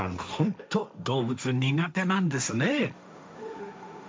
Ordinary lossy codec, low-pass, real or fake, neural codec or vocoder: none; none; fake; codec, 16 kHz, 1.1 kbps, Voila-Tokenizer